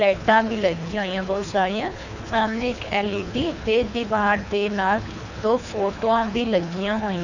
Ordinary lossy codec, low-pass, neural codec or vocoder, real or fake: none; 7.2 kHz; codec, 24 kHz, 3 kbps, HILCodec; fake